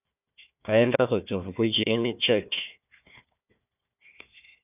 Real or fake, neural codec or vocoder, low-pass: fake; codec, 16 kHz, 1 kbps, FunCodec, trained on Chinese and English, 50 frames a second; 3.6 kHz